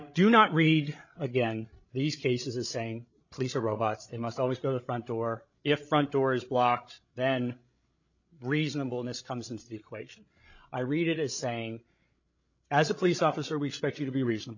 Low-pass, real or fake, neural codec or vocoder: 7.2 kHz; fake; codec, 16 kHz, 16 kbps, FreqCodec, larger model